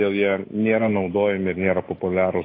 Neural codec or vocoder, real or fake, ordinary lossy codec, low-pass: none; real; AAC, 24 kbps; 5.4 kHz